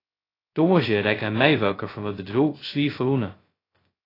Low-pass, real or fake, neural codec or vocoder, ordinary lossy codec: 5.4 kHz; fake; codec, 16 kHz, 0.2 kbps, FocalCodec; AAC, 24 kbps